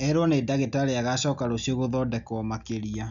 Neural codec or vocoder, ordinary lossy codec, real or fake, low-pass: none; none; real; 7.2 kHz